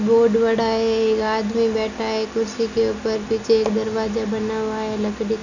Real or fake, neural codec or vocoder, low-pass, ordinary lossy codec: real; none; 7.2 kHz; none